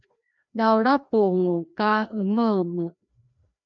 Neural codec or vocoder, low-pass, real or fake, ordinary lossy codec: codec, 16 kHz, 1 kbps, FreqCodec, larger model; 7.2 kHz; fake; MP3, 64 kbps